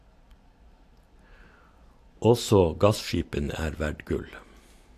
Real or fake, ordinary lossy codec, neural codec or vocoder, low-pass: real; AAC, 64 kbps; none; 14.4 kHz